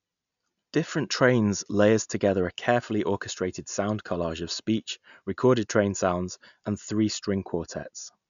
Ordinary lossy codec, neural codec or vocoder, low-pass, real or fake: none; none; 7.2 kHz; real